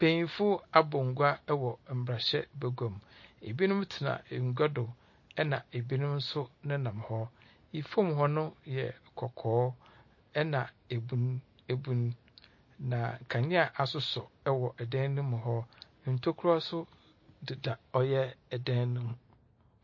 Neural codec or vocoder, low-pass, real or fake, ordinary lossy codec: none; 7.2 kHz; real; MP3, 32 kbps